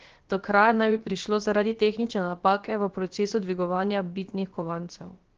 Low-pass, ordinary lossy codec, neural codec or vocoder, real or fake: 7.2 kHz; Opus, 16 kbps; codec, 16 kHz, about 1 kbps, DyCAST, with the encoder's durations; fake